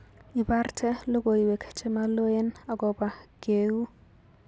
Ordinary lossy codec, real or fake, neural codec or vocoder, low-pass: none; real; none; none